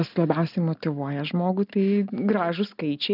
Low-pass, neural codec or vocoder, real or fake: 5.4 kHz; none; real